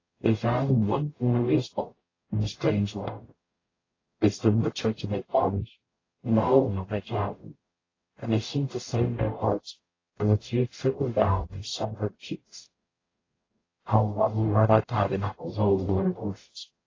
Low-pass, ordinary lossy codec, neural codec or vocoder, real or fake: 7.2 kHz; AAC, 32 kbps; codec, 44.1 kHz, 0.9 kbps, DAC; fake